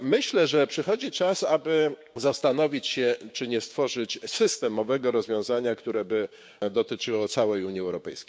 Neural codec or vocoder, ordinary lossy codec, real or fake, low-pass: codec, 16 kHz, 6 kbps, DAC; none; fake; none